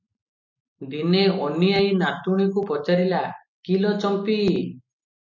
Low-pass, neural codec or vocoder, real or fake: 7.2 kHz; none; real